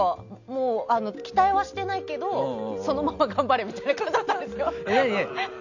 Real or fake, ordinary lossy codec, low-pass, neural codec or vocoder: real; none; 7.2 kHz; none